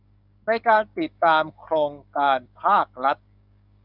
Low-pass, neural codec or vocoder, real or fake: 5.4 kHz; codec, 44.1 kHz, 7.8 kbps, DAC; fake